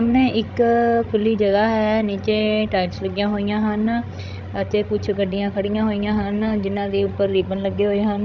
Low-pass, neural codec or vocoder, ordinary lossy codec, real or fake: 7.2 kHz; codec, 16 kHz, 16 kbps, FreqCodec, larger model; none; fake